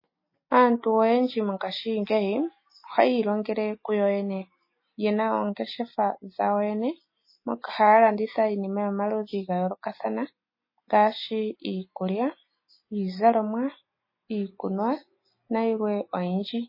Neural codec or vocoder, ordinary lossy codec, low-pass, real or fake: none; MP3, 24 kbps; 5.4 kHz; real